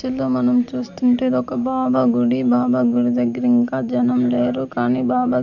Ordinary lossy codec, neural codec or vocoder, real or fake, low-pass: none; none; real; 7.2 kHz